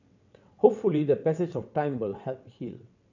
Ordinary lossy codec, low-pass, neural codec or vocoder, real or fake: none; 7.2 kHz; vocoder, 22.05 kHz, 80 mel bands, WaveNeXt; fake